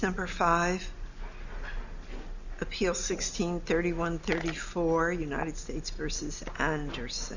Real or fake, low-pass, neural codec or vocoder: real; 7.2 kHz; none